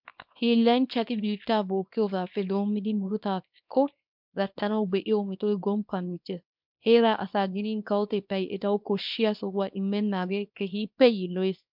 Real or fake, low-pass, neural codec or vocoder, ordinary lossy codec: fake; 5.4 kHz; codec, 24 kHz, 0.9 kbps, WavTokenizer, small release; MP3, 48 kbps